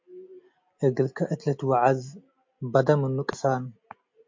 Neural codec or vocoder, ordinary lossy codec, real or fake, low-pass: none; MP3, 48 kbps; real; 7.2 kHz